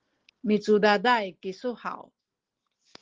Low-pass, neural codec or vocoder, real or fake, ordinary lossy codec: 7.2 kHz; none; real; Opus, 16 kbps